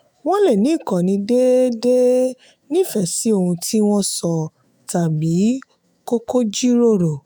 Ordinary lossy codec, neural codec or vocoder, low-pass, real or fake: none; autoencoder, 48 kHz, 128 numbers a frame, DAC-VAE, trained on Japanese speech; none; fake